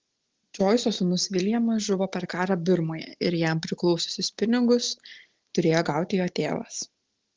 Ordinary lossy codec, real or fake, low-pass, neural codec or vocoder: Opus, 16 kbps; real; 7.2 kHz; none